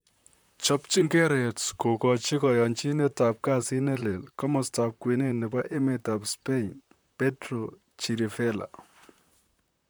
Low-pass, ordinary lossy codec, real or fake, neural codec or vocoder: none; none; fake; vocoder, 44.1 kHz, 128 mel bands, Pupu-Vocoder